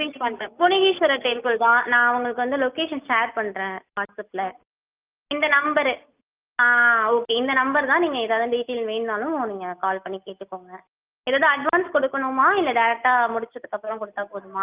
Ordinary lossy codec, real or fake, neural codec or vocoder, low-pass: Opus, 24 kbps; real; none; 3.6 kHz